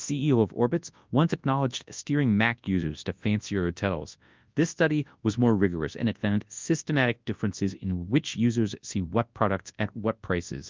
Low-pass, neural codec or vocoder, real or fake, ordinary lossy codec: 7.2 kHz; codec, 24 kHz, 0.9 kbps, WavTokenizer, large speech release; fake; Opus, 24 kbps